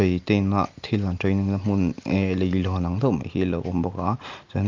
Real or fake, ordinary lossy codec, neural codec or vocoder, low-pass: real; Opus, 32 kbps; none; 7.2 kHz